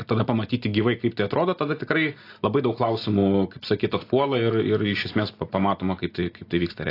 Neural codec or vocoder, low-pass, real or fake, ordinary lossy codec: none; 5.4 kHz; real; AAC, 32 kbps